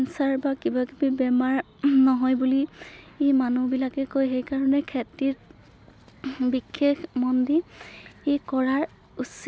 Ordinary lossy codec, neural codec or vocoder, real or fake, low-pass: none; none; real; none